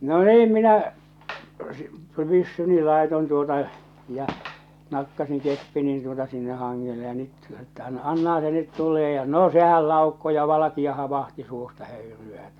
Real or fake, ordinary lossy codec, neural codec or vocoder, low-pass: real; none; none; 19.8 kHz